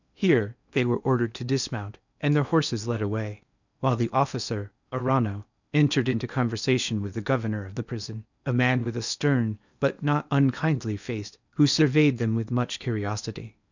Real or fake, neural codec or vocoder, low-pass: fake; codec, 16 kHz in and 24 kHz out, 0.8 kbps, FocalCodec, streaming, 65536 codes; 7.2 kHz